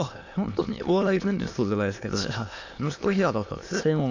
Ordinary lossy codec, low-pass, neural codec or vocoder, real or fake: AAC, 48 kbps; 7.2 kHz; autoencoder, 22.05 kHz, a latent of 192 numbers a frame, VITS, trained on many speakers; fake